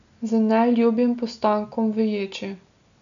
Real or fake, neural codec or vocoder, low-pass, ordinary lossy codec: real; none; 7.2 kHz; none